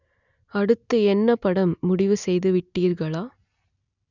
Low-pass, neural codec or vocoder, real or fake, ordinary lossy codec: 7.2 kHz; none; real; none